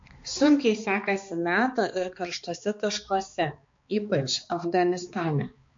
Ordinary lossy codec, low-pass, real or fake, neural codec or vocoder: MP3, 48 kbps; 7.2 kHz; fake; codec, 16 kHz, 2 kbps, X-Codec, HuBERT features, trained on balanced general audio